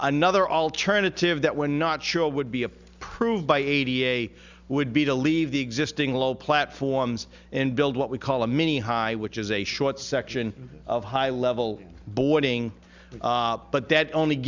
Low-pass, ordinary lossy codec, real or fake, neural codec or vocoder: 7.2 kHz; Opus, 64 kbps; real; none